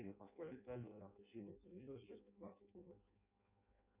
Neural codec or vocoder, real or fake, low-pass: codec, 16 kHz in and 24 kHz out, 0.6 kbps, FireRedTTS-2 codec; fake; 3.6 kHz